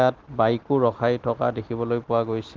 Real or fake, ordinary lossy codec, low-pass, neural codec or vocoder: real; Opus, 16 kbps; 7.2 kHz; none